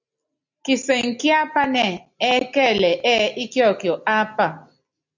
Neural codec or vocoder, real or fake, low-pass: none; real; 7.2 kHz